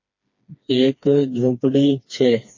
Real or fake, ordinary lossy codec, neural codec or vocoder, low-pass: fake; MP3, 32 kbps; codec, 16 kHz, 2 kbps, FreqCodec, smaller model; 7.2 kHz